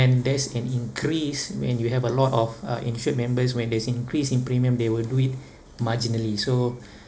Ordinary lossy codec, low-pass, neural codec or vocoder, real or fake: none; none; none; real